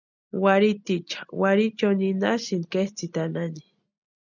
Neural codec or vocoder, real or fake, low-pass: none; real; 7.2 kHz